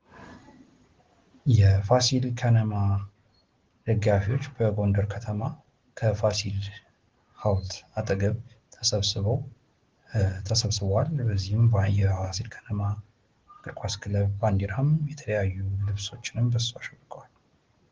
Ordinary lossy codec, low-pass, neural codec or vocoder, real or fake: Opus, 16 kbps; 7.2 kHz; none; real